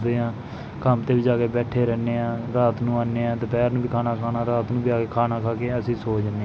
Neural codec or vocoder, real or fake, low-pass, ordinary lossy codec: none; real; none; none